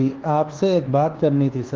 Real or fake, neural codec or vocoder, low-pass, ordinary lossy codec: fake; codec, 24 kHz, 1.2 kbps, DualCodec; 7.2 kHz; Opus, 16 kbps